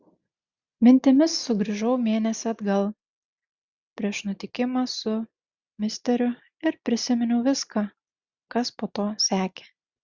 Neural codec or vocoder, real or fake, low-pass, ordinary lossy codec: none; real; 7.2 kHz; Opus, 64 kbps